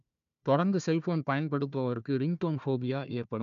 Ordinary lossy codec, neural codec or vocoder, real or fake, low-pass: none; codec, 16 kHz, 1 kbps, FunCodec, trained on Chinese and English, 50 frames a second; fake; 7.2 kHz